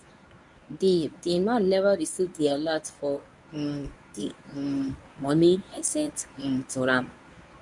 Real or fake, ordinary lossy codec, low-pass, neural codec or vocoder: fake; none; 10.8 kHz; codec, 24 kHz, 0.9 kbps, WavTokenizer, medium speech release version 1